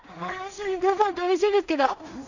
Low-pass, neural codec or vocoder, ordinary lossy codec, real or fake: 7.2 kHz; codec, 16 kHz in and 24 kHz out, 0.4 kbps, LongCat-Audio-Codec, two codebook decoder; none; fake